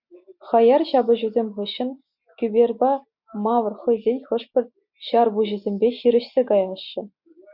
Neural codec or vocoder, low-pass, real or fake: none; 5.4 kHz; real